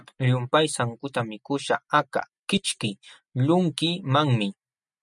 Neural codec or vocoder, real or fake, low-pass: none; real; 10.8 kHz